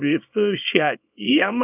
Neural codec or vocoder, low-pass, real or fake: codec, 24 kHz, 0.9 kbps, WavTokenizer, small release; 3.6 kHz; fake